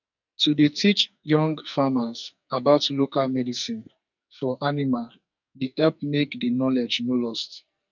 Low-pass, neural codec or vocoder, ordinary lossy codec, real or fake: 7.2 kHz; codec, 44.1 kHz, 2.6 kbps, SNAC; none; fake